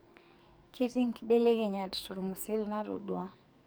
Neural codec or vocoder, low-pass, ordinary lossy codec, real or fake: codec, 44.1 kHz, 2.6 kbps, SNAC; none; none; fake